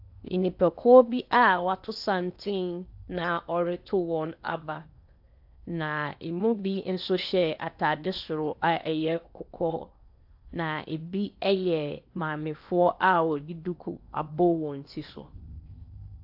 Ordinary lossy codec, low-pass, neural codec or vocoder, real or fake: AAC, 48 kbps; 5.4 kHz; codec, 16 kHz in and 24 kHz out, 0.8 kbps, FocalCodec, streaming, 65536 codes; fake